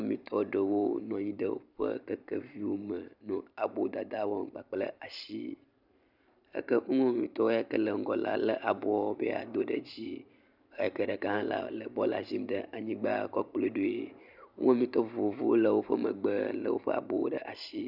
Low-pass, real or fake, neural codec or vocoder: 5.4 kHz; fake; vocoder, 44.1 kHz, 128 mel bands every 512 samples, BigVGAN v2